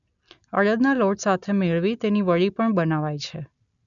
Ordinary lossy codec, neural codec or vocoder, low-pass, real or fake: none; none; 7.2 kHz; real